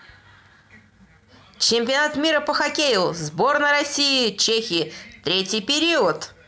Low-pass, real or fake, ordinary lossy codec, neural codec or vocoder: none; real; none; none